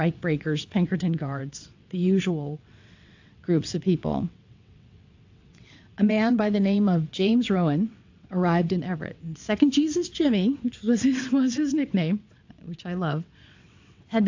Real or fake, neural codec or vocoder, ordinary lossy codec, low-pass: fake; vocoder, 22.05 kHz, 80 mel bands, WaveNeXt; AAC, 48 kbps; 7.2 kHz